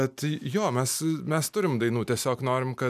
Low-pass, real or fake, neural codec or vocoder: 14.4 kHz; real; none